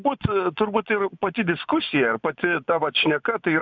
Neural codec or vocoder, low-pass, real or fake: vocoder, 24 kHz, 100 mel bands, Vocos; 7.2 kHz; fake